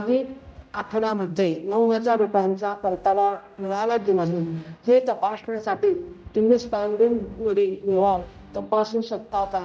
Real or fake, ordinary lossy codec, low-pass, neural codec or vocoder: fake; none; none; codec, 16 kHz, 0.5 kbps, X-Codec, HuBERT features, trained on general audio